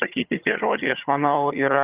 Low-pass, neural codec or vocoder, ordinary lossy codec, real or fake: 3.6 kHz; vocoder, 22.05 kHz, 80 mel bands, HiFi-GAN; Opus, 24 kbps; fake